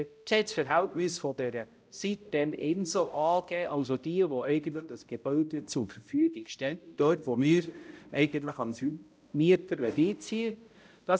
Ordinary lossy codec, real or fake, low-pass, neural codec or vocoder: none; fake; none; codec, 16 kHz, 0.5 kbps, X-Codec, HuBERT features, trained on balanced general audio